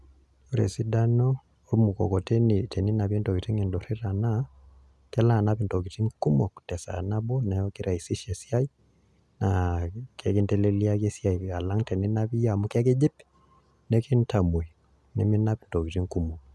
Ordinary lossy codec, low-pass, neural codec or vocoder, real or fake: none; none; none; real